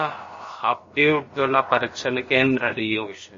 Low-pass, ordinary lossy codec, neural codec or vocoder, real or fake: 7.2 kHz; MP3, 32 kbps; codec, 16 kHz, about 1 kbps, DyCAST, with the encoder's durations; fake